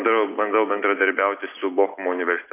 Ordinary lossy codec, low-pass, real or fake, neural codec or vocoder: AAC, 24 kbps; 3.6 kHz; real; none